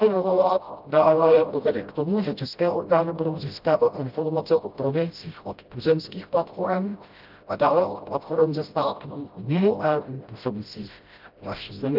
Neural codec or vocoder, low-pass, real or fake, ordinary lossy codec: codec, 16 kHz, 0.5 kbps, FreqCodec, smaller model; 5.4 kHz; fake; Opus, 32 kbps